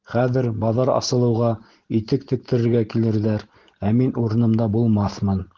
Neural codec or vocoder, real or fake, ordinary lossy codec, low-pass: none; real; Opus, 16 kbps; 7.2 kHz